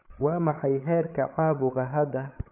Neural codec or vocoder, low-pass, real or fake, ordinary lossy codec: codec, 16 kHz, 8 kbps, FreqCodec, larger model; 3.6 kHz; fake; none